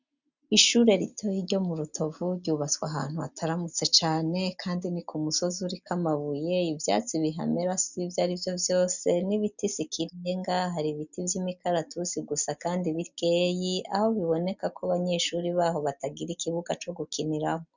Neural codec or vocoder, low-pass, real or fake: none; 7.2 kHz; real